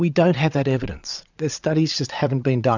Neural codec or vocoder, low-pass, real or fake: vocoder, 22.05 kHz, 80 mel bands, Vocos; 7.2 kHz; fake